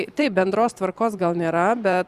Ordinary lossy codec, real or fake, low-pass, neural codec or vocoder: Opus, 64 kbps; fake; 14.4 kHz; vocoder, 44.1 kHz, 128 mel bands every 512 samples, BigVGAN v2